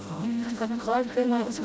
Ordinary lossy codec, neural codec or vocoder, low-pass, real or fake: none; codec, 16 kHz, 0.5 kbps, FreqCodec, smaller model; none; fake